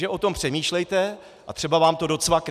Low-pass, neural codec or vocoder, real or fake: 14.4 kHz; none; real